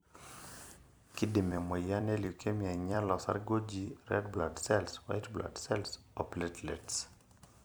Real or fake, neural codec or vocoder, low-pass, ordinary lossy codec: fake; vocoder, 44.1 kHz, 128 mel bands every 256 samples, BigVGAN v2; none; none